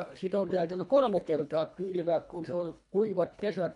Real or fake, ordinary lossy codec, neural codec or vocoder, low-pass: fake; none; codec, 24 kHz, 1.5 kbps, HILCodec; 10.8 kHz